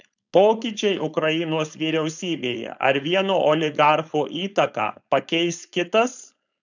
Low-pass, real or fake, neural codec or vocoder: 7.2 kHz; fake; codec, 16 kHz, 4.8 kbps, FACodec